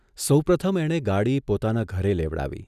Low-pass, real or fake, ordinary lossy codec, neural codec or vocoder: 14.4 kHz; real; none; none